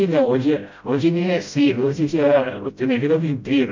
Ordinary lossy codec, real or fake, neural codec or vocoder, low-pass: MP3, 48 kbps; fake; codec, 16 kHz, 0.5 kbps, FreqCodec, smaller model; 7.2 kHz